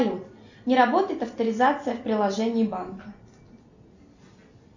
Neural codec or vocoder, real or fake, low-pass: none; real; 7.2 kHz